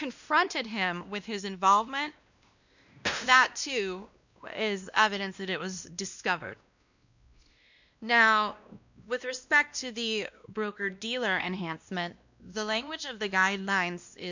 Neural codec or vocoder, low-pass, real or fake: codec, 16 kHz, 1 kbps, X-Codec, WavLM features, trained on Multilingual LibriSpeech; 7.2 kHz; fake